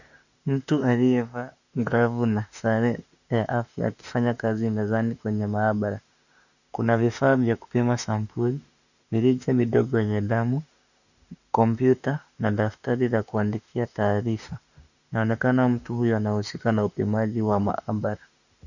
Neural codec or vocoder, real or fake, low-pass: autoencoder, 48 kHz, 32 numbers a frame, DAC-VAE, trained on Japanese speech; fake; 7.2 kHz